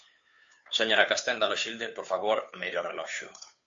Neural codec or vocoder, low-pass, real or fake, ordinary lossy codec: codec, 16 kHz, 2 kbps, FunCodec, trained on Chinese and English, 25 frames a second; 7.2 kHz; fake; MP3, 48 kbps